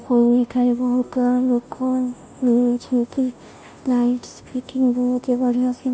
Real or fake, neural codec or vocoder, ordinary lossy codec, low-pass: fake; codec, 16 kHz, 0.5 kbps, FunCodec, trained on Chinese and English, 25 frames a second; none; none